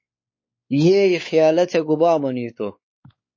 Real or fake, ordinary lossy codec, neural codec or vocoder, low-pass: fake; MP3, 32 kbps; codec, 16 kHz, 4 kbps, X-Codec, WavLM features, trained on Multilingual LibriSpeech; 7.2 kHz